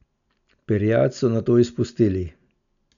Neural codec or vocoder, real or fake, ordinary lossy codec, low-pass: none; real; none; 7.2 kHz